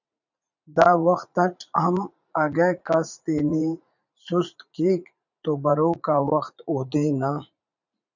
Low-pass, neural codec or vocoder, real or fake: 7.2 kHz; vocoder, 44.1 kHz, 80 mel bands, Vocos; fake